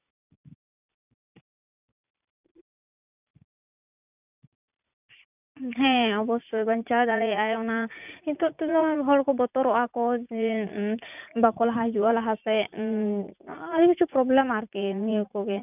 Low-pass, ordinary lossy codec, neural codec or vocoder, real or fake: 3.6 kHz; none; vocoder, 44.1 kHz, 80 mel bands, Vocos; fake